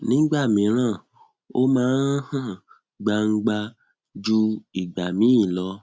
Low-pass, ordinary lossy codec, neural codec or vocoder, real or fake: none; none; none; real